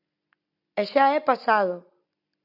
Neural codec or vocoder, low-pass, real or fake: none; 5.4 kHz; real